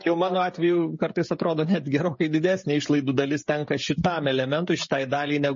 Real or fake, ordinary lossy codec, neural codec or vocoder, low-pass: fake; MP3, 32 kbps; codec, 16 kHz, 16 kbps, FreqCodec, smaller model; 7.2 kHz